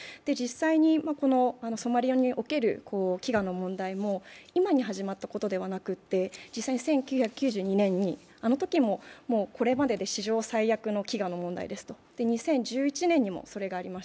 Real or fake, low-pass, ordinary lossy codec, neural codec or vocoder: real; none; none; none